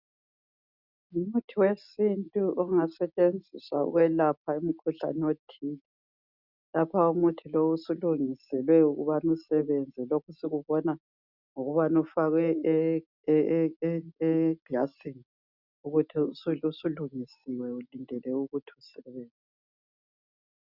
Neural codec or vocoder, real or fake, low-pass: none; real; 5.4 kHz